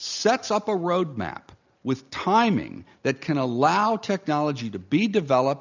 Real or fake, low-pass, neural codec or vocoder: real; 7.2 kHz; none